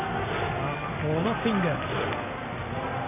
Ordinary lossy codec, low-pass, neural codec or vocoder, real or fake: none; 3.6 kHz; none; real